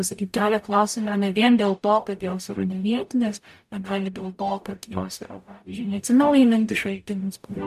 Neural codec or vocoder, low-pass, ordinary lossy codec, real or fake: codec, 44.1 kHz, 0.9 kbps, DAC; 14.4 kHz; MP3, 96 kbps; fake